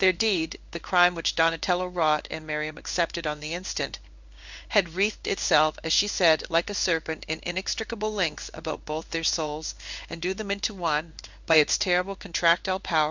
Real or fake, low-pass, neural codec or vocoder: fake; 7.2 kHz; codec, 16 kHz in and 24 kHz out, 1 kbps, XY-Tokenizer